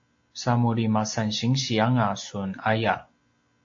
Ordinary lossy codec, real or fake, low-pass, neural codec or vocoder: AAC, 48 kbps; real; 7.2 kHz; none